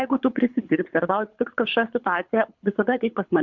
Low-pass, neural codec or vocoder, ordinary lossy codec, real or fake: 7.2 kHz; codec, 24 kHz, 6 kbps, HILCodec; MP3, 64 kbps; fake